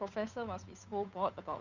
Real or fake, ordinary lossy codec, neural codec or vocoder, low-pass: fake; none; codec, 16 kHz, 8 kbps, FunCodec, trained on Chinese and English, 25 frames a second; 7.2 kHz